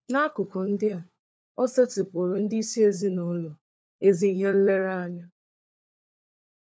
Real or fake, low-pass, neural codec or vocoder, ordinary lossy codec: fake; none; codec, 16 kHz, 4 kbps, FunCodec, trained on LibriTTS, 50 frames a second; none